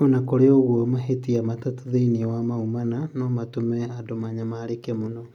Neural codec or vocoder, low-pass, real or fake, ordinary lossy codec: none; 19.8 kHz; real; MP3, 96 kbps